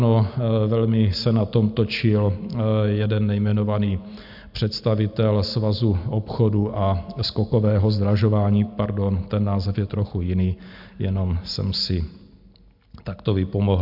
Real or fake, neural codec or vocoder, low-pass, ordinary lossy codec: real; none; 5.4 kHz; AAC, 48 kbps